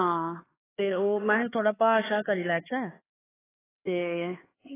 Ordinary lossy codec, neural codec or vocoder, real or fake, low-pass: AAC, 16 kbps; codec, 16 kHz, 4 kbps, X-Codec, HuBERT features, trained on LibriSpeech; fake; 3.6 kHz